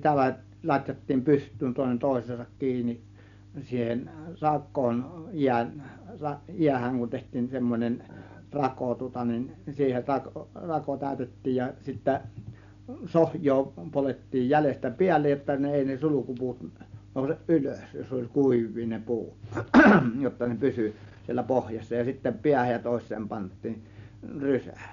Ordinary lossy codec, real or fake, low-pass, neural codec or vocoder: none; real; 7.2 kHz; none